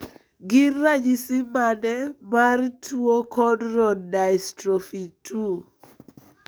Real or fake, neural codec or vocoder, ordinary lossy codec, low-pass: fake; codec, 44.1 kHz, 7.8 kbps, DAC; none; none